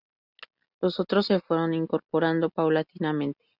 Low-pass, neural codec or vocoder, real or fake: 5.4 kHz; none; real